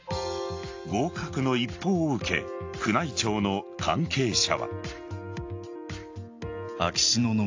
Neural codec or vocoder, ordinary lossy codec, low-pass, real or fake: none; AAC, 48 kbps; 7.2 kHz; real